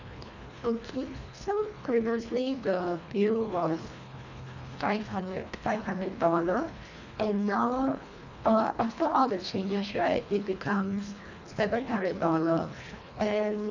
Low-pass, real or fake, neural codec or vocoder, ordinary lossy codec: 7.2 kHz; fake; codec, 24 kHz, 1.5 kbps, HILCodec; none